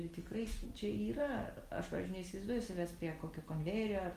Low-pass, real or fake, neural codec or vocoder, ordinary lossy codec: 14.4 kHz; real; none; Opus, 24 kbps